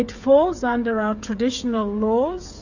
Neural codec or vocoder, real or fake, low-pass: codec, 16 kHz, 16 kbps, FreqCodec, smaller model; fake; 7.2 kHz